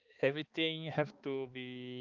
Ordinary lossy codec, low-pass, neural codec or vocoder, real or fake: Opus, 32 kbps; 7.2 kHz; codec, 16 kHz, 2 kbps, X-Codec, HuBERT features, trained on balanced general audio; fake